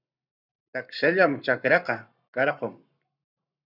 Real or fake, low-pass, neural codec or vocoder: fake; 5.4 kHz; codec, 44.1 kHz, 7.8 kbps, Pupu-Codec